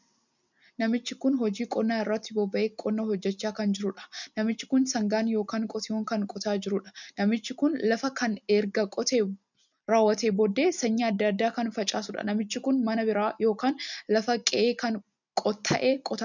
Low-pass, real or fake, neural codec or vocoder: 7.2 kHz; real; none